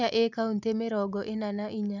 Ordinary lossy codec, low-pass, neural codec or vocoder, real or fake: none; 7.2 kHz; none; real